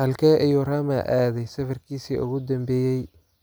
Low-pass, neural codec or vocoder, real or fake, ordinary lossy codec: none; none; real; none